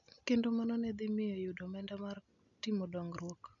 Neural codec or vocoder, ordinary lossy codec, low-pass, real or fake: none; none; 7.2 kHz; real